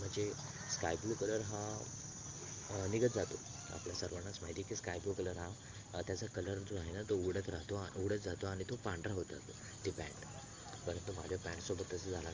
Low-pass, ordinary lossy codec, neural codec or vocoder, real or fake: 7.2 kHz; Opus, 32 kbps; none; real